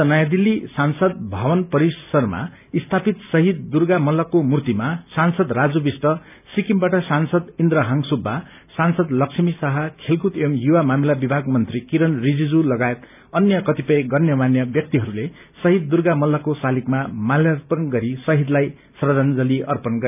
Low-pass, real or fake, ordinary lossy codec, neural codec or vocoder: 3.6 kHz; real; none; none